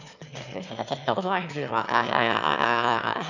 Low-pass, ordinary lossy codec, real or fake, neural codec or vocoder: 7.2 kHz; none; fake; autoencoder, 22.05 kHz, a latent of 192 numbers a frame, VITS, trained on one speaker